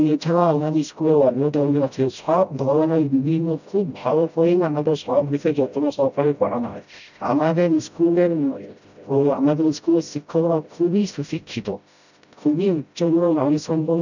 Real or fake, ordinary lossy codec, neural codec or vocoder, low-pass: fake; none; codec, 16 kHz, 0.5 kbps, FreqCodec, smaller model; 7.2 kHz